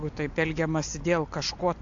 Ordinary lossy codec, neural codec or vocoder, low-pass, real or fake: AAC, 48 kbps; none; 7.2 kHz; real